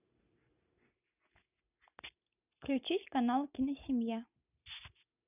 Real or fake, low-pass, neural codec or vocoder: real; 3.6 kHz; none